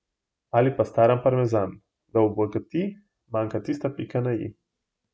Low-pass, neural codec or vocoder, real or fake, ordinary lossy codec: none; none; real; none